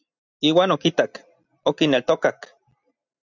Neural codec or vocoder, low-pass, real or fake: none; 7.2 kHz; real